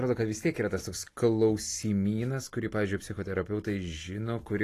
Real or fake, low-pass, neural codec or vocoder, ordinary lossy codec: real; 14.4 kHz; none; AAC, 48 kbps